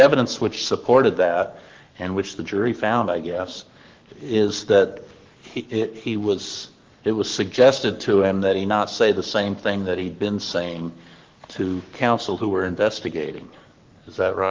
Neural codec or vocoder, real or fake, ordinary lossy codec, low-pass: codec, 44.1 kHz, 7.8 kbps, Pupu-Codec; fake; Opus, 32 kbps; 7.2 kHz